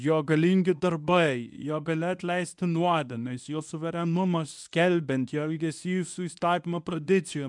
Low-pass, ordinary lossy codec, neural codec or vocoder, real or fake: 10.8 kHz; MP3, 96 kbps; codec, 24 kHz, 0.9 kbps, WavTokenizer, medium speech release version 1; fake